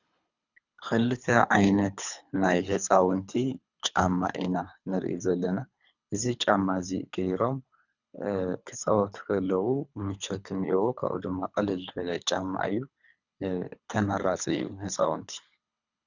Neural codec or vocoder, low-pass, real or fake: codec, 24 kHz, 3 kbps, HILCodec; 7.2 kHz; fake